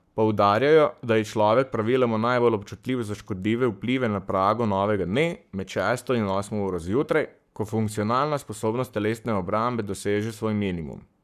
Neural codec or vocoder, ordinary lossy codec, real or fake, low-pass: codec, 44.1 kHz, 7.8 kbps, Pupu-Codec; none; fake; 14.4 kHz